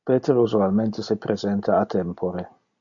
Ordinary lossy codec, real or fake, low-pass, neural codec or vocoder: AAC, 64 kbps; real; 7.2 kHz; none